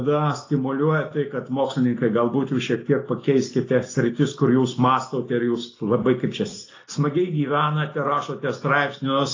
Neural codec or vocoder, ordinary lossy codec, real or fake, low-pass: none; AAC, 32 kbps; real; 7.2 kHz